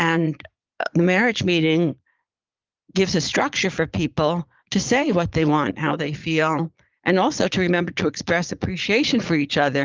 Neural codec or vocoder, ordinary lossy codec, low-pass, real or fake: vocoder, 22.05 kHz, 80 mel bands, Vocos; Opus, 24 kbps; 7.2 kHz; fake